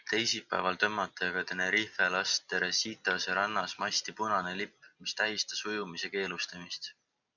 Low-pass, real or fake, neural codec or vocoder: 7.2 kHz; real; none